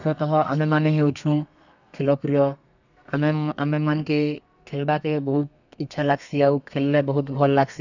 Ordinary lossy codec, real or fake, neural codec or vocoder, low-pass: none; fake; codec, 32 kHz, 1.9 kbps, SNAC; 7.2 kHz